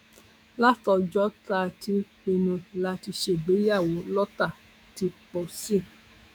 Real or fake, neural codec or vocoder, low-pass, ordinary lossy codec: fake; autoencoder, 48 kHz, 128 numbers a frame, DAC-VAE, trained on Japanese speech; none; none